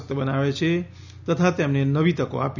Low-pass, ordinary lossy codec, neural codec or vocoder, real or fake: 7.2 kHz; none; none; real